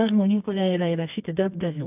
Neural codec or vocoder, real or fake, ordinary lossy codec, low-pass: codec, 24 kHz, 0.9 kbps, WavTokenizer, medium music audio release; fake; AAC, 32 kbps; 3.6 kHz